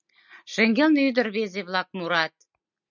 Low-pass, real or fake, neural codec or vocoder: 7.2 kHz; real; none